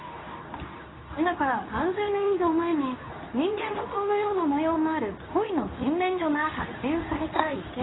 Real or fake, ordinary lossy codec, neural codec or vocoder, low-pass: fake; AAC, 16 kbps; codec, 24 kHz, 0.9 kbps, WavTokenizer, medium speech release version 2; 7.2 kHz